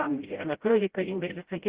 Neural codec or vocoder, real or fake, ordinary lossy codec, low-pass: codec, 16 kHz, 0.5 kbps, FreqCodec, smaller model; fake; Opus, 16 kbps; 3.6 kHz